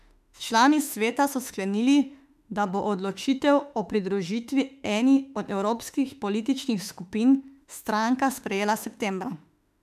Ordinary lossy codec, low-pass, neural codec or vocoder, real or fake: none; 14.4 kHz; autoencoder, 48 kHz, 32 numbers a frame, DAC-VAE, trained on Japanese speech; fake